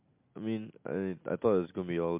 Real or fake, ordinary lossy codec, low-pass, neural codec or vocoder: real; MP3, 32 kbps; 3.6 kHz; none